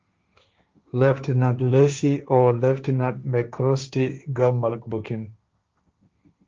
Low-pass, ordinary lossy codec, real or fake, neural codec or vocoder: 7.2 kHz; Opus, 24 kbps; fake; codec, 16 kHz, 1.1 kbps, Voila-Tokenizer